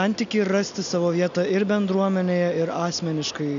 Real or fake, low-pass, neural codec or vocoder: real; 7.2 kHz; none